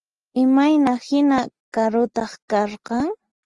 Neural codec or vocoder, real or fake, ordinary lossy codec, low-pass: none; real; Opus, 24 kbps; 10.8 kHz